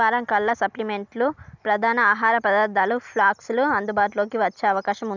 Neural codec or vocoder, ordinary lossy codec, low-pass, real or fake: none; none; 7.2 kHz; real